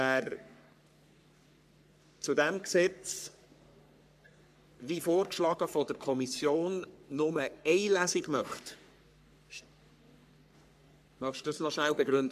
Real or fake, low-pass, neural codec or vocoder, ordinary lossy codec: fake; 14.4 kHz; codec, 44.1 kHz, 3.4 kbps, Pupu-Codec; AAC, 96 kbps